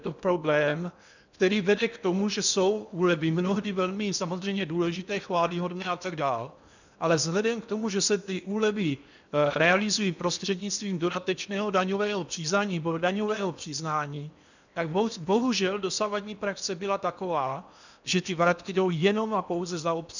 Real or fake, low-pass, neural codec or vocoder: fake; 7.2 kHz; codec, 16 kHz in and 24 kHz out, 0.8 kbps, FocalCodec, streaming, 65536 codes